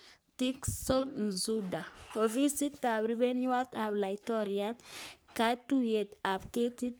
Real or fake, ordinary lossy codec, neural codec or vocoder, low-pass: fake; none; codec, 44.1 kHz, 3.4 kbps, Pupu-Codec; none